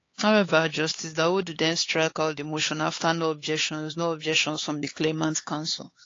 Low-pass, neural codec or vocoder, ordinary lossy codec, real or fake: 7.2 kHz; codec, 16 kHz, 4 kbps, X-Codec, HuBERT features, trained on LibriSpeech; AAC, 32 kbps; fake